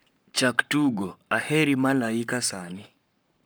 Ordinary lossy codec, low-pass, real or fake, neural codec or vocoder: none; none; fake; codec, 44.1 kHz, 7.8 kbps, Pupu-Codec